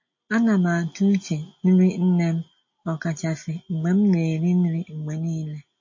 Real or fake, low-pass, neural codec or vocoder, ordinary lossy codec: real; 7.2 kHz; none; MP3, 32 kbps